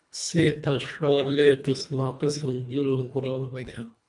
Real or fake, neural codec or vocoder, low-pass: fake; codec, 24 kHz, 1.5 kbps, HILCodec; 10.8 kHz